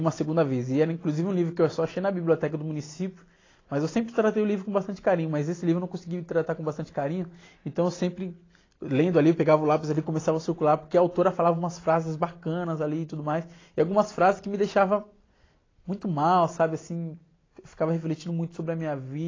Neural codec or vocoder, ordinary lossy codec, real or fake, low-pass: none; AAC, 32 kbps; real; 7.2 kHz